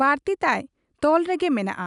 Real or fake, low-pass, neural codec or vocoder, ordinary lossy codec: real; 10.8 kHz; none; none